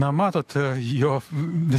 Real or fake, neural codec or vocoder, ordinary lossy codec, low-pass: fake; codec, 44.1 kHz, 7.8 kbps, DAC; AAC, 96 kbps; 14.4 kHz